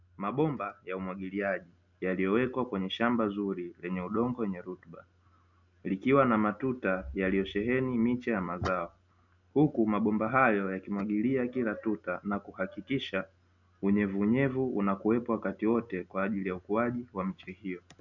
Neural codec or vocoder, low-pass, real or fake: none; 7.2 kHz; real